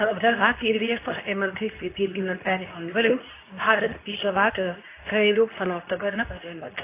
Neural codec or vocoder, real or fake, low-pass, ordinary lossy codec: codec, 24 kHz, 0.9 kbps, WavTokenizer, medium speech release version 2; fake; 3.6 kHz; AAC, 24 kbps